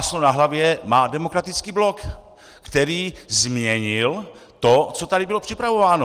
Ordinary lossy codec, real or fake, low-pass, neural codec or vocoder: Opus, 24 kbps; real; 14.4 kHz; none